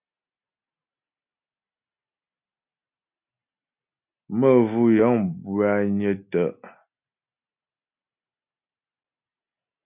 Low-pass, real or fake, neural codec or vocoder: 3.6 kHz; real; none